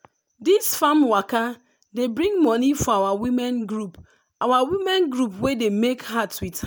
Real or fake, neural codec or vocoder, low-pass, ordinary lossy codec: real; none; none; none